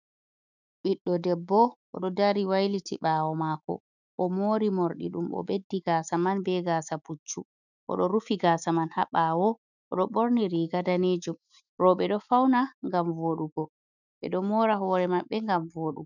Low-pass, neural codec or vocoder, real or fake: 7.2 kHz; autoencoder, 48 kHz, 128 numbers a frame, DAC-VAE, trained on Japanese speech; fake